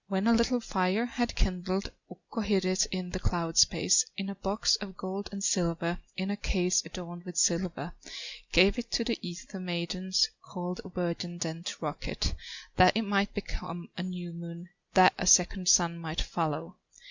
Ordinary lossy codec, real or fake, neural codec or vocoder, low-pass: Opus, 64 kbps; real; none; 7.2 kHz